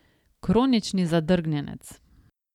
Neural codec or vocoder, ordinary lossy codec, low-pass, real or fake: none; none; 19.8 kHz; real